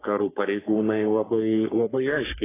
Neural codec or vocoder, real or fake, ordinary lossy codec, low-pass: codec, 44.1 kHz, 3.4 kbps, Pupu-Codec; fake; AAC, 16 kbps; 3.6 kHz